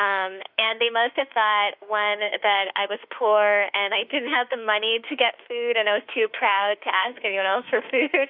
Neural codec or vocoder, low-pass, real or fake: autoencoder, 48 kHz, 32 numbers a frame, DAC-VAE, trained on Japanese speech; 5.4 kHz; fake